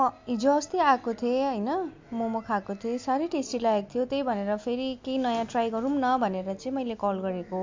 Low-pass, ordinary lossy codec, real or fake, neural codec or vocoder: 7.2 kHz; MP3, 48 kbps; real; none